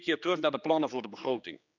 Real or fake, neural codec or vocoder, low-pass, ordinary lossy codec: fake; codec, 16 kHz, 2 kbps, X-Codec, HuBERT features, trained on general audio; 7.2 kHz; none